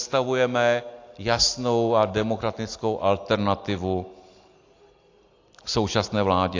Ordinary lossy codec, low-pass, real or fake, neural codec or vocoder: AAC, 48 kbps; 7.2 kHz; real; none